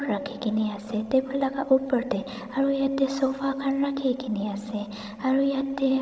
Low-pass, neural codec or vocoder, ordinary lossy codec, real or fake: none; codec, 16 kHz, 16 kbps, FreqCodec, larger model; none; fake